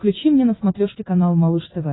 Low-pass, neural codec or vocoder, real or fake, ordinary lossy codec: 7.2 kHz; none; real; AAC, 16 kbps